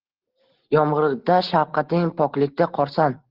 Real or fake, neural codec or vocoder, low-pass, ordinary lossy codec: real; none; 5.4 kHz; Opus, 16 kbps